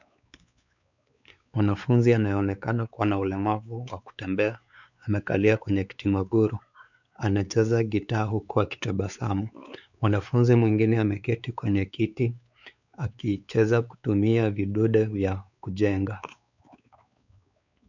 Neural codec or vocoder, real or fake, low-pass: codec, 16 kHz, 4 kbps, X-Codec, WavLM features, trained on Multilingual LibriSpeech; fake; 7.2 kHz